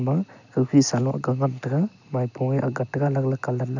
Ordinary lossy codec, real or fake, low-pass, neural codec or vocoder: none; real; 7.2 kHz; none